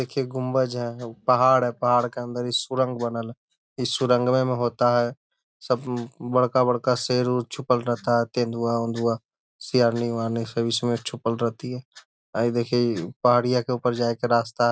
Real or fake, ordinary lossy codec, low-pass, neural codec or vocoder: real; none; none; none